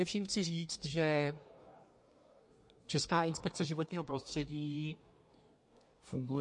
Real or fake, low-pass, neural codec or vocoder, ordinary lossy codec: fake; 10.8 kHz; codec, 24 kHz, 1 kbps, SNAC; MP3, 48 kbps